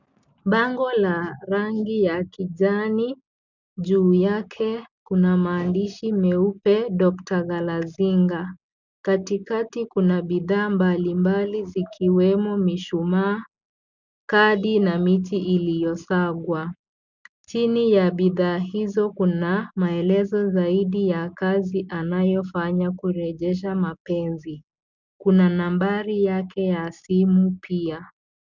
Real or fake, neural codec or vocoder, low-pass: real; none; 7.2 kHz